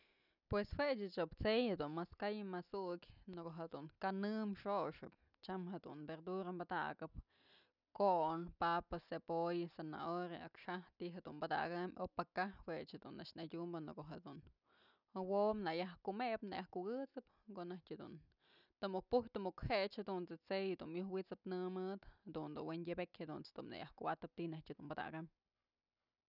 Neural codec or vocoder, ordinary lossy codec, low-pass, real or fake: none; none; 5.4 kHz; real